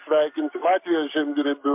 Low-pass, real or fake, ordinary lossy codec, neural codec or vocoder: 3.6 kHz; fake; MP3, 32 kbps; codec, 44.1 kHz, 7.8 kbps, Pupu-Codec